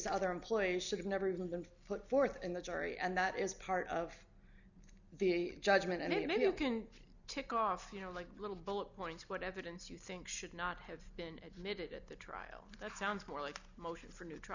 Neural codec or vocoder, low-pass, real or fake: none; 7.2 kHz; real